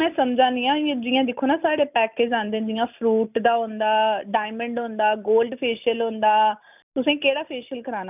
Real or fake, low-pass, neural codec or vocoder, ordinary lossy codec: real; 3.6 kHz; none; none